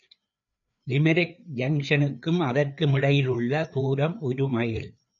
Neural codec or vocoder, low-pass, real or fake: codec, 16 kHz, 4 kbps, FreqCodec, larger model; 7.2 kHz; fake